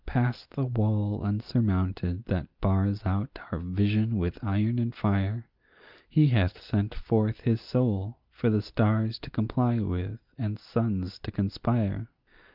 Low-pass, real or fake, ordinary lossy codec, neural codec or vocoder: 5.4 kHz; real; Opus, 32 kbps; none